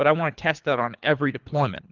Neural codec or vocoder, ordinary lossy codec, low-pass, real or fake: codec, 24 kHz, 3 kbps, HILCodec; Opus, 24 kbps; 7.2 kHz; fake